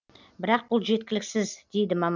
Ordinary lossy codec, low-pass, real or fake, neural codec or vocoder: none; 7.2 kHz; real; none